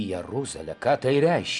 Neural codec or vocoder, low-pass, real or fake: none; 10.8 kHz; real